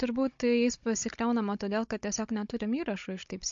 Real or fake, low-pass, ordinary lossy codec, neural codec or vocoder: fake; 7.2 kHz; MP3, 48 kbps; codec, 16 kHz, 16 kbps, FunCodec, trained on Chinese and English, 50 frames a second